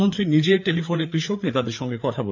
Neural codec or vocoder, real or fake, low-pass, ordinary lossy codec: codec, 16 kHz, 4 kbps, FreqCodec, larger model; fake; 7.2 kHz; none